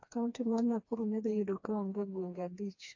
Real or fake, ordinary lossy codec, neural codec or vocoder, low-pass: fake; AAC, 48 kbps; codec, 16 kHz, 2 kbps, FreqCodec, smaller model; 7.2 kHz